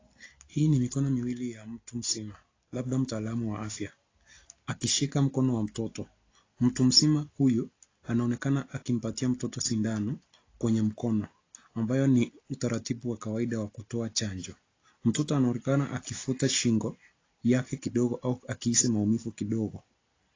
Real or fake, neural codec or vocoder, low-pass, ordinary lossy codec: real; none; 7.2 kHz; AAC, 32 kbps